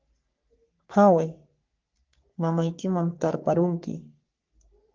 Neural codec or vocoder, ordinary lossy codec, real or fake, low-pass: codec, 44.1 kHz, 3.4 kbps, Pupu-Codec; Opus, 32 kbps; fake; 7.2 kHz